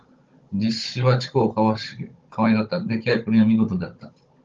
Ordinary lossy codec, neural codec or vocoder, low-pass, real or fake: Opus, 32 kbps; codec, 16 kHz, 16 kbps, FunCodec, trained on Chinese and English, 50 frames a second; 7.2 kHz; fake